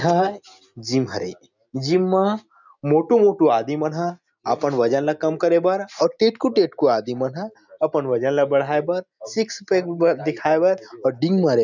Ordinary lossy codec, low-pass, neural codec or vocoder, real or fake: none; 7.2 kHz; none; real